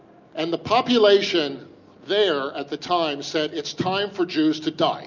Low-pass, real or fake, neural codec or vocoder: 7.2 kHz; real; none